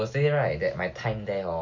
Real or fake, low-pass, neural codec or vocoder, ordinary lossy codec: real; 7.2 kHz; none; MP3, 48 kbps